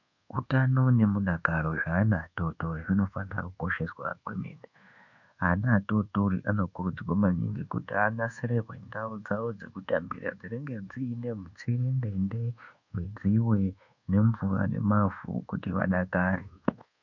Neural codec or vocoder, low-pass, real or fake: codec, 24 kHz, 1.2 kbps, DualCodec; 7.2 kHz; fake